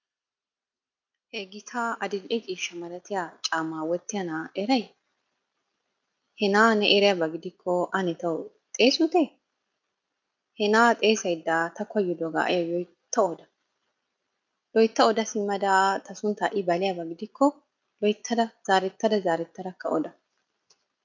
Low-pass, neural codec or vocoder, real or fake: 7.2 kHz; none; real